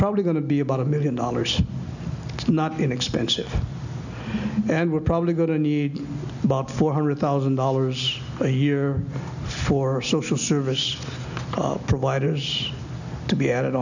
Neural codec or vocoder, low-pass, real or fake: none; 7.2 kHz; real